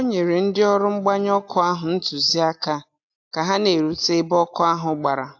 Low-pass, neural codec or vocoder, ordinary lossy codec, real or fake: 7.2 kHz; none; AAC, 48 kbps; real